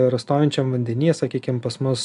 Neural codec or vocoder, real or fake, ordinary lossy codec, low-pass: none; real; AAC, 96 kbps; 10.8 kHz